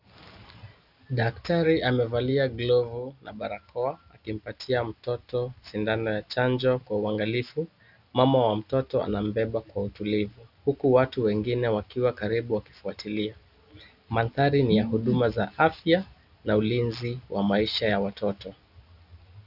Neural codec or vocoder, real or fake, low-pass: none; real; 5.4 kHz